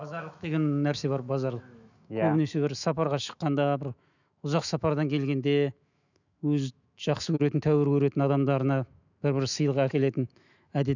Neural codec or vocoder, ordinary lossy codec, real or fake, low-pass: autoencoder, 48 kHz, 128 numbers a frame, DAC-VAE, trained on Japanese speech; none; fake; 7.2 kHz